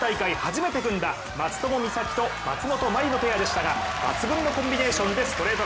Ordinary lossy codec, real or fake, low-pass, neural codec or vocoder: none; real; none; none